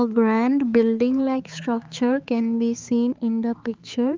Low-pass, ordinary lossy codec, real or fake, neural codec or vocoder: 7.2 kHz; Opus, 32 kbps; fake; codec, 16 kHz, 4 kbps, X-Codec, HuBERT features, trained on balanced general audio